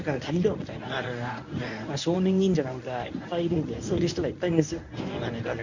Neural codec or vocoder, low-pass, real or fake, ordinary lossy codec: codec, 24 kHz, 0.9 kbps, WavTokenizer, medium speech release version 1; 7.2 kHz; fake; none